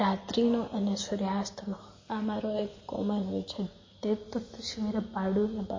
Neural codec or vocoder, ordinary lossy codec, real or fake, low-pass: none; MP3, 32 kbps; real; 7.2 kHz